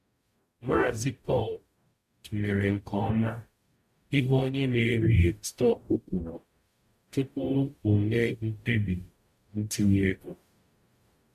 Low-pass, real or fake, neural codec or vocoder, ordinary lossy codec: 14.4 kHz; fake; codec, 44.1 kHz, 0.9 kbps, DAC; MP3, 64 kbps